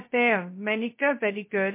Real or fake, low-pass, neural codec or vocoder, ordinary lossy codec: fake; 3.6 kHz; codec, 16 kHz, 0.2 kbps, FocalCodec; MP3, 24 kbps